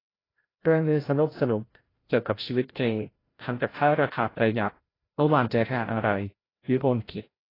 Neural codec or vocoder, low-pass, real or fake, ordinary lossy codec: codec, 16 kHz, 0.5 kbps, FreqCodec, larger model; 5.4 kHz; fake; AAC, 24 kbps